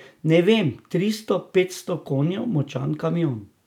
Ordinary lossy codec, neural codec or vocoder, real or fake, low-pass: none; none; real; 19.8 kHz